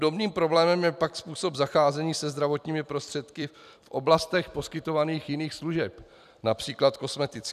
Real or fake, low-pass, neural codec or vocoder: fake; 14.4 kHz; vocoder, 44.1 kHz, 128 mel bands every 256 samples, BigVGAN v2